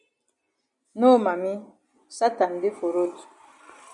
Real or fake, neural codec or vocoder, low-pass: real; none; 10.8 kHz